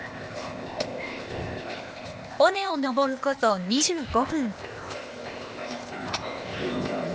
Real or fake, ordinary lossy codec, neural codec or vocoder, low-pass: fake; none; codec, 16 kHz, 0.8 kbps, ZipCodec; none